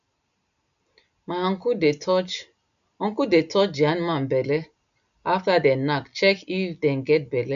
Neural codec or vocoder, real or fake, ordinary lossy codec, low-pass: none; real; none; 7.2 kHz